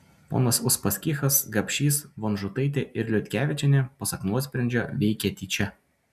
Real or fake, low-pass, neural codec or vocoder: real; 14.4 kHz; none